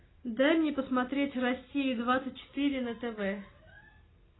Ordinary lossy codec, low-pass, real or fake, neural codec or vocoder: AAC, 16 kbps; 7.2 kHz; real; none